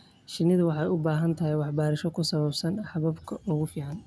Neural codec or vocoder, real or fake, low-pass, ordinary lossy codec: none; real; none; none